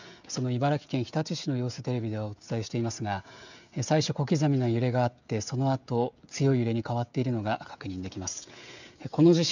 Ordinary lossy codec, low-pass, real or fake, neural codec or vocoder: none; 7.2 kHz; fake; codec, 16 kHz, 16 kbps, FreqCodec, smaller model